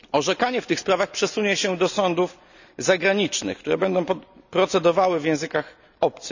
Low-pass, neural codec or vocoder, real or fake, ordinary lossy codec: 7.2 kHz; none; real; none